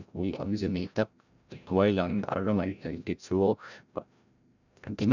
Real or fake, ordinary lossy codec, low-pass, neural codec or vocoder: fake; none; 7.2 kHz; codec, 16 kHz, 0.5 kbps, FreqCodec, larger model